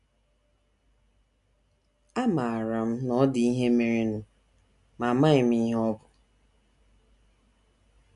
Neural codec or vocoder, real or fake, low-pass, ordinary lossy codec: none; real; 10.8 kHz; none